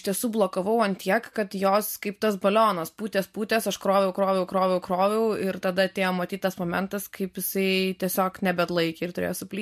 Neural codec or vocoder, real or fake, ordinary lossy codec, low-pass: none; real; MP3, 64 kbps; 14.4 kHz